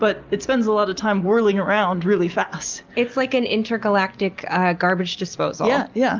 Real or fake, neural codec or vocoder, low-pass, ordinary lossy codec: real; none; 7.2 kHz; Opus, 32 kbps